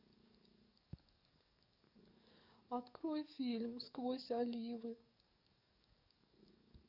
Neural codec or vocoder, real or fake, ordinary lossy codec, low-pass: codec, 44.1 kHz, 7.8 kbps, DAC; fake; none; 5.4 kHz